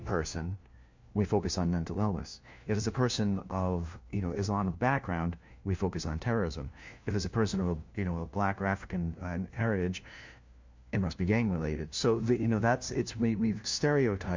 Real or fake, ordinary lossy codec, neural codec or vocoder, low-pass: fake; MP3, 48 kbps; codec, 16 kHz, 1 kbps, FunCodec, trained on LibriTTS, 50 frames a second; 7.2 kHz